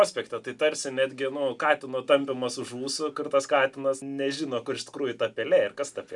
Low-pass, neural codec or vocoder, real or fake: 10.8 kHz; none; real